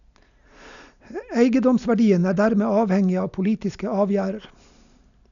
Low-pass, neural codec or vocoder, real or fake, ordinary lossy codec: 7.2 kHz; none; real; none